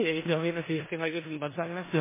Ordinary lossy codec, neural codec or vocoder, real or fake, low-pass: MP3, 16 kbps; codec, 16 kHz in and 24 kHz out, 0.4 kbps, LongCat-Audio-Codec, four codebook decoder; fake; 3.6 kHz